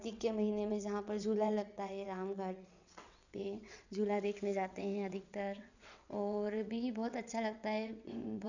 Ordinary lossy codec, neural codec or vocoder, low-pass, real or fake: none; vocoder, 22.05 kHz, 80 mel bands, WaveNeXt; 7.2 kHz; fake